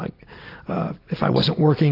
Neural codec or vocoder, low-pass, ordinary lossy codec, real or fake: none; 5.4 kHz; AAC, 32 kbps; real